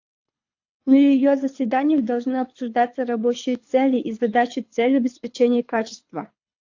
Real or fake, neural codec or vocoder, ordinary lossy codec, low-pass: fake; codec, 24 kHz, 6 kbps, HILCodec; AAC, 48 kbps; 7.2 kHz